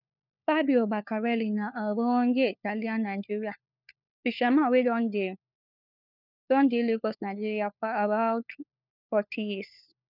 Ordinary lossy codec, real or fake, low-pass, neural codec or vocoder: none; fake; 5.4 kHz; codec, 16 kHz, 4 kbps, FunCodec, trained on LibriTTS, 50 frames a second